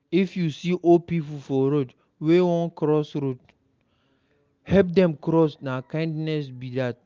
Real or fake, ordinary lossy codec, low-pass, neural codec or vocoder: real; Opus, 24 kbps; 7.2 kHz; none